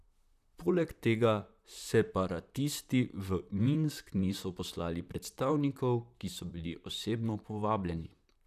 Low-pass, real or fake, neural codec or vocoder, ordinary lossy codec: 14.4 kHz; fake; vocoder, 44.1 kHz, 128 mel bands, Pupu-Vocoder; none